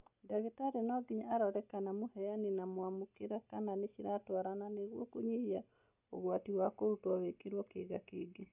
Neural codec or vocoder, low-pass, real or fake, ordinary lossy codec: none; 3.6 kHz; real; none